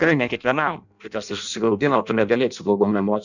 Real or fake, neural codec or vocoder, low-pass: fake; codec, 16 kHz in and 24 kHz out, 0.6 kbps, FireRedTTS-2 codec; 7.2 kHz